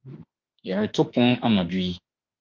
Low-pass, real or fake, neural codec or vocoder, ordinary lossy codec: 7.2 kHz; fake; autoencoder, 48 kHz, 32 numbers a frame, DAC-VAE, trained on Japanese speech; Opus, 32 kbps